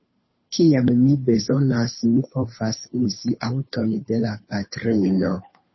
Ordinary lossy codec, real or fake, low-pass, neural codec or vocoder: MP3, 24 kbps; fake; 7.2 kHz; codec, 16 kHz, 2 kbps, FunCodec, trained on Chinese and English, 25 frames a second